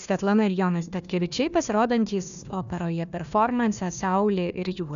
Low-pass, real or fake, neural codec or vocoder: 7.2 kHz; fake; codec, 16 kHz, 1 kbps, FunCodec, trained on Chinese and English, 50 frames a second